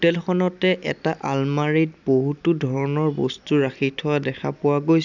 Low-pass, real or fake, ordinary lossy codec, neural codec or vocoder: 7.2 kHz; real; none; none